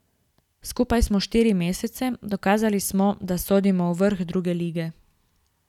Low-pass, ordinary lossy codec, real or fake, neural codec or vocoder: 19.8 kHz; none; real; none